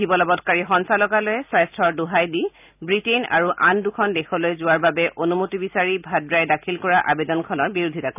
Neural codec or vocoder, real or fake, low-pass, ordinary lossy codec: none; real; 3.6 kHz; none